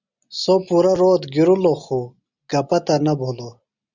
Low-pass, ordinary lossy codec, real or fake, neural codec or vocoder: 7.2 kHz; Opus, 64 kbps; real; none